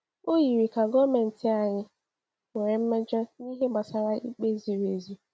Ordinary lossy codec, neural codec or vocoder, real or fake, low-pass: none; none; real; none